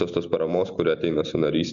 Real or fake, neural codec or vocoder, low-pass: real; none; 7.2 kHz